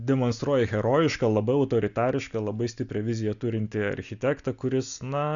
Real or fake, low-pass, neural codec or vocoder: real; 7.2 kHz; none